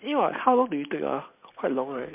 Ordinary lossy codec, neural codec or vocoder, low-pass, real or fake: MP3, 32 kbps; none; 3.6 kHz; real